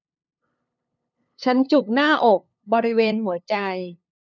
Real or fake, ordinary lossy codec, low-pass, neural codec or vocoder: fake; none; 7.2 kHz; codec, 16 kHz, 2 kbps, FunCodec, trained on LibriTTS, 25 frames a second